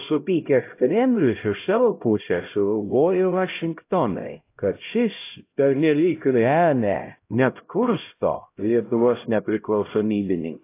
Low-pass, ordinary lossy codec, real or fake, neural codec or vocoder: 3.6 kHz; AAC, 24 kbps; fake; codec, 16 kHz, 0.5 kbps, X-Codec, HuBERT features, trained on LibriSpeech